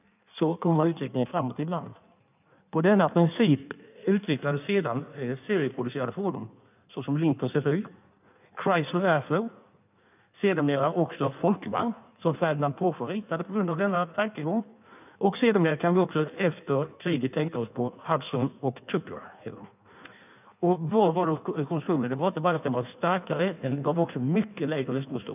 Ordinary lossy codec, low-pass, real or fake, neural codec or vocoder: none; 3.6 kHz; fake; codec, 16 kHz in and 24 kHz out, 1.1 kbps, FireRedTTS-2 codec